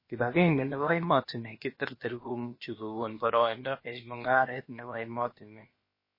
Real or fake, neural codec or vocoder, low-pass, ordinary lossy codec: fake; codec, 16 kHz, about 1 kbps, DyCAST, with the encoder's durations; 5.4 kHz; MP3, 24 kbps